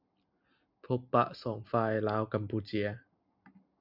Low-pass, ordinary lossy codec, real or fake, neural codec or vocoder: 5.4 kHz; Opus, 64 kbps; real; none